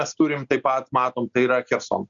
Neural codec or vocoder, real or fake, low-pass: none; real; 7.2 kHz